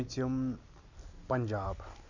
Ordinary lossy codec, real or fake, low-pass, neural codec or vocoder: none; real; 7.2 kHz; none